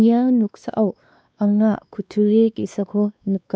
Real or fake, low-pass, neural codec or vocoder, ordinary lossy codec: fake; none; codec, 16 kHz, 2 kbps, X-Codec, WavLM features, trained on Multilingual LibriSpeech; none